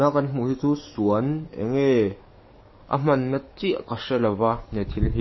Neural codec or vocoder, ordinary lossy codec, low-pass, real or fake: codec, 44.1 kHz, 7.8 kbps, DAC; MP3, 24 kbps; 7.2 kHz; fake